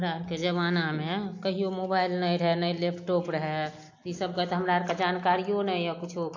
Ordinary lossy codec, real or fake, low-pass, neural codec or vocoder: AAC, 48 kbps; real; 7.2 kHz; none